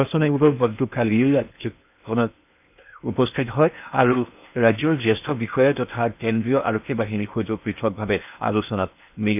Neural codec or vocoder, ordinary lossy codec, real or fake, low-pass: codec, 16 kHz in and 24 kHz out, 0.8 kbps, FocalCodec, streaming, 65536 codes; none; fake; 3.6 kHz